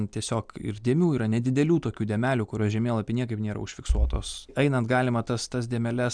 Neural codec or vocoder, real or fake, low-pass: none; real; 9.9 kHz